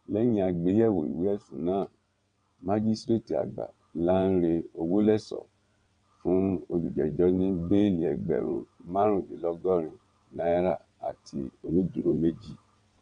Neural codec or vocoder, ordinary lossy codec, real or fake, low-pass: vocoder, 22.05 kHz, 80 mel bands, WaveNeXt; none; fake; 9.9 kHz